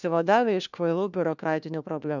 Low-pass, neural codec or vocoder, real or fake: 7.2 kHz; codec, 16 kHz, 1 kbps, FunCodec, trained on LibriTTS, 50 frames a second; fake